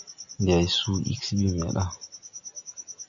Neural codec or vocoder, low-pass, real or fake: none; 7.2 kHz; real